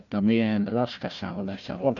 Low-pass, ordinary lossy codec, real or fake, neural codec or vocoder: 7.2 kHz; none; fake; codec, 16 kHz, 1 kbps, FunCodec, trained on Chinese and English, 50 frames a second